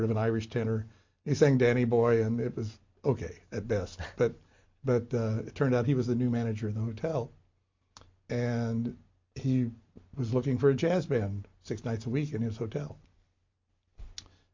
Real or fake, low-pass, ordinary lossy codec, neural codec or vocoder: real; 7.2 kHz; MP3, 48 kbps; none